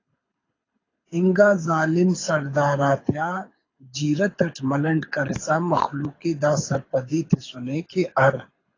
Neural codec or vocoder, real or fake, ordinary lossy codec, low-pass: codec, 24 kHz, 6 kbps, HILCodec; fake; AAC, 32 kbps; 7.2 kHz